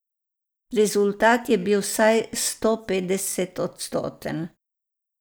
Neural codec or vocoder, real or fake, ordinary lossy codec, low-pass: none; real; none; none